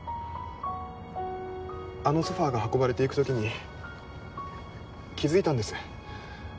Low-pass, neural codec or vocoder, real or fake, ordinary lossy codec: none; none; real; none